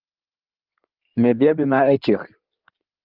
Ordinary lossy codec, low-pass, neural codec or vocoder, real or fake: Opus, 32 kbps; 5.4 kHz; codec, 16 kHz in and 24 kHz out, 2.2 kbps, FireRedTTS-2 codec; fake